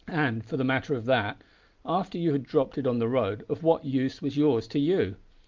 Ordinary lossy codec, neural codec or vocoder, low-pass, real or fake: Opus, 24 kbps; none; 7.2 kHz; real